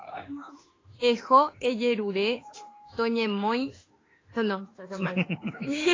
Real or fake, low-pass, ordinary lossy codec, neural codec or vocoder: fake; 7.2 kHz; AAC, 32 kbps; autoencoder, 48 kHz, 32 numbers a frame, DAC-VAE, trained on Japanese speech